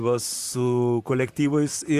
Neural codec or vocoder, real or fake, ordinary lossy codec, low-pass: vocoder, 44.1 kHz, 128 mel bands, Pupu-Vocoder; fake; Opus, 64 kbps; 14.4 kHz